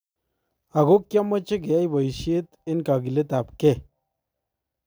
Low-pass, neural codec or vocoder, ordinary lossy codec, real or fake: none; none; none; real